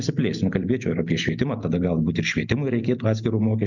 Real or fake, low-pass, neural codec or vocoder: real; 7.2 kHz; none